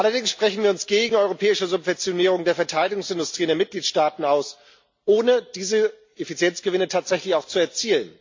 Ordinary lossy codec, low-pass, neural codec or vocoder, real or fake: MP3, 48 kbps; 7.2 kHz; none; real